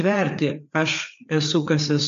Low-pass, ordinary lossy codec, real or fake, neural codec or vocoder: 7.2 kHz; MP3, 48 kbps; fake; codec, 16 kHz, 4 kbps, FreqCodec, larger model